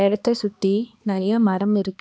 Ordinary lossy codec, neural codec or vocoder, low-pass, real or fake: none; codec, 16 kHz, 2 kbps, X-Codec, HuBERT features, trained on balanced general audio; none; fake